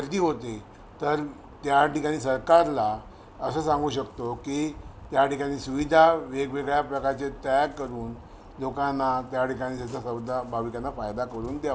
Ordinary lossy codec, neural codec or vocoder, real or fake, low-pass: none; none; real; none